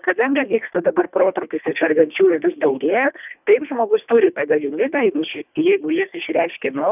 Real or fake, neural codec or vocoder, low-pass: fake; codec, 24 kHz, 1.5 kbps, HILCodec; 3.6 kHz